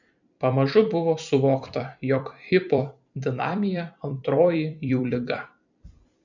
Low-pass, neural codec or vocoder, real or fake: 7.2 kHz; vocoder, 44.1 kHz, 128 mel bands every 256 samples, BigVGAN v2; fake